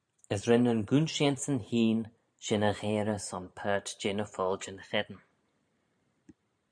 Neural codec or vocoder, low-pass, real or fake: vocoder, 44.1 kHz, 128 mel bands every 512 samples, BigVGAN v2; 9.9 kHz; fake